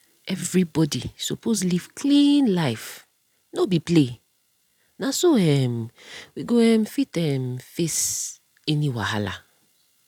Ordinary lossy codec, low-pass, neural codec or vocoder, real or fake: none; 19.8 kHz; none; real